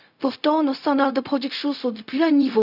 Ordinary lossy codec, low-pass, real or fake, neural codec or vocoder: none; 5.4 kHz; fake; codec, 16 kHz, 0.4 kbps, LongCat-Audio-Codec